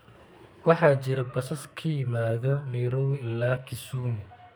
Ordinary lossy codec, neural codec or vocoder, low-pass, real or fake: none; codec, 44.1 kHz, 2.6 kbps, SNAC; none; fake